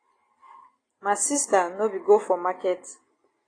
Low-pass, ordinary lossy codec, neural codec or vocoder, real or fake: 9.9 kHz; AAC, 32 kbps; none; real